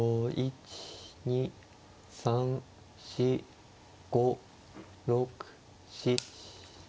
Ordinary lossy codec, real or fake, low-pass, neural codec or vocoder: none; real; none; none